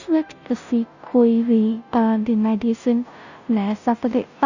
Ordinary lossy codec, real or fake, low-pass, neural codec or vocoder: none; fake; 7.2 kHz; codec, 16 kHz, 0.5 kbps, FunCodec, trained on Chinese and English, 25 frames a second